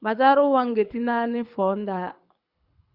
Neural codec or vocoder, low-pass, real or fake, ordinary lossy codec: codec, 24 kHz, 6 kbps, HILCodec; 5.4 kHz; fake; Opus, 64 kbps